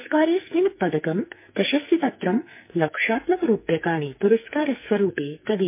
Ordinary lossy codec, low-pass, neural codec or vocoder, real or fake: MP3, 24 kbps; 3.6 kHz; codec, 44.1 kHz, 2.6 kbps, SNAC; fake